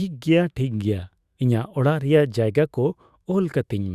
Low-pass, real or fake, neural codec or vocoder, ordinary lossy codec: 14.4 kHz; fake; autoencoder, 48 kHz, 128 numbers a frame, DAC-VAE, trained on Japanese speech; Opus, 64 kbps